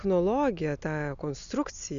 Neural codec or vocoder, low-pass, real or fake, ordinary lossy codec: none; 7.2 kHz; real; MP3, 96 kbps